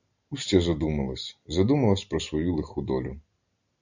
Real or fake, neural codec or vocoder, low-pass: real; none; 7.2 kHz